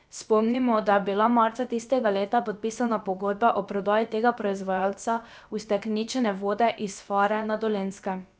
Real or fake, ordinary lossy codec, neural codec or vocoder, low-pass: fake; none; codec, 16 kHz, about 1 kbps, DyCAST, with the encoder's durations; none